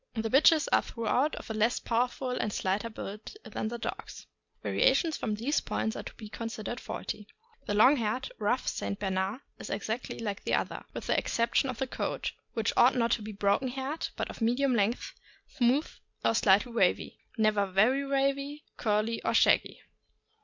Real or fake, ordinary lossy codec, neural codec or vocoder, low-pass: real; MP3, 64 kbps; none; 7.2 kHz